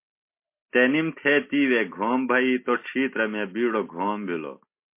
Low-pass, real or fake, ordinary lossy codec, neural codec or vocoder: 3.6 kHz; real; MP3, 24 kbps; none